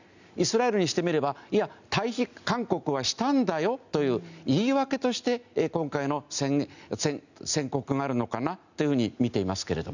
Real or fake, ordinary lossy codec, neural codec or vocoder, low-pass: real; none; none; 7.2 kHz